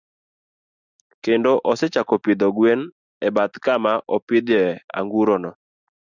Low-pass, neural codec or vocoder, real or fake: 7.2 kHz; none; real